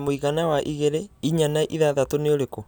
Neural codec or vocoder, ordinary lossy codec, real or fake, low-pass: none; none; real; none